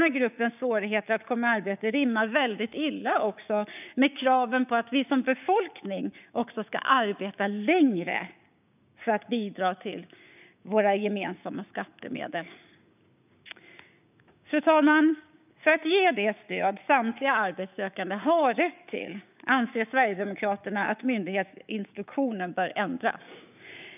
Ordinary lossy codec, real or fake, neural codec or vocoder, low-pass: none; fake; codec, 16 kHz, 6 kbps, DAC; 3.6 kHz